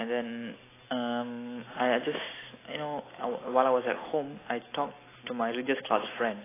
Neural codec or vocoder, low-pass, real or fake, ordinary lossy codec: none; 3.6 kHz; real; AAC, 16 kbps